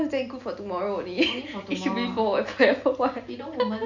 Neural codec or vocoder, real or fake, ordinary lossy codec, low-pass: none; real; none; 7.2 kHz